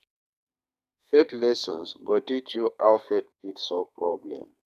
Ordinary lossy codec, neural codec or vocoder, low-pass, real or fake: none; codec, 32 kHz, 1.9 kbps, SNAC; 14.4 kHz; fake